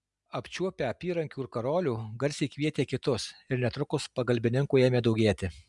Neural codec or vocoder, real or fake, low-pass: none; real; 10.8 kHz